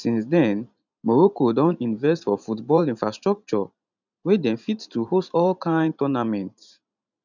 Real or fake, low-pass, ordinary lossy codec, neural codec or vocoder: fake; 7.2 kHz; none; vocoder, 44.1 kHz, 128 mel bands every 512 samples, BigVGAN v2